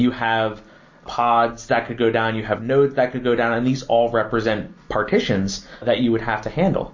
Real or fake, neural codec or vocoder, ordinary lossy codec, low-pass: real; none; MP3, 32 kbps; 7.2 kHz